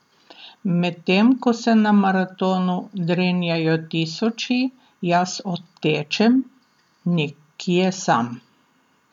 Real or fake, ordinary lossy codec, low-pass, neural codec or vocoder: real; none; 19.8 kHz; none